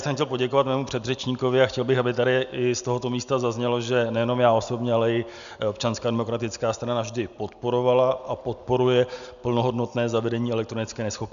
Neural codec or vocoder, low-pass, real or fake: none; 7.2 kHz; real